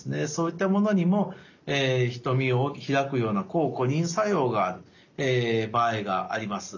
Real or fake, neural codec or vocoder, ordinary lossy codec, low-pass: real; none; none; 7.2 kHz